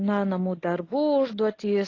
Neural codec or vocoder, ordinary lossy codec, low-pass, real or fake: none; AAC, 32 kbps; 7.2 kHz; real